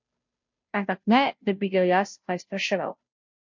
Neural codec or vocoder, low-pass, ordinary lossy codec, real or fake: codec, 16 kHz, 0.5 kbps, FunCodec, trained on Chinese and English, 25 frames a second; 7.2 kHz; MP3, 48 kbps; fake